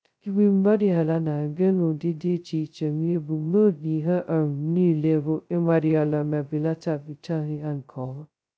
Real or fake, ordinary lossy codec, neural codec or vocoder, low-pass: fake; none; codec, 16 kHz, 0.2 kbps, FocalCodec; none